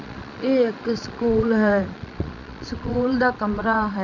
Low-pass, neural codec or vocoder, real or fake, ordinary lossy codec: 7.2 kHz; vocoder, 22.05 kHz, 80 mel bands, Vocos; fake; none